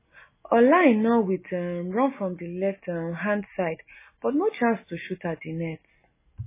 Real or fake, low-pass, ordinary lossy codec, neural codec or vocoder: real; 3.6 kHz; MP3, 16 kbps; none